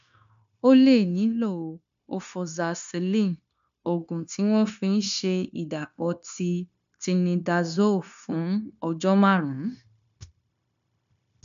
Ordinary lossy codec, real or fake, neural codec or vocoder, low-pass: none; fake; codec, 16 kHz, 0.9 kbps, LongCat-Audio-Codec; 7.2 kHz